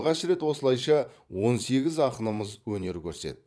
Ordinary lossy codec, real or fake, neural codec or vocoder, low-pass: none; fake; vocoder, 22.05 kHz, 80 mel bands, Vocos; none